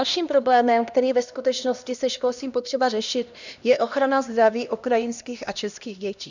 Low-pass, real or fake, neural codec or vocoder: 7.2 kHz; fake; codec, 16 kHz, 1 kbps, X-Codec, HuBERT features, trained on LibriSpeech